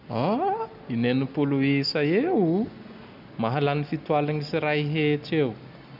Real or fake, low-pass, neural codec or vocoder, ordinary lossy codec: real; 5.4 kHz; none; none